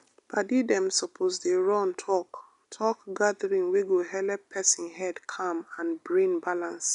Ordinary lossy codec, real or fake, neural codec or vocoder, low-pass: none; real; none; 10.8 kHz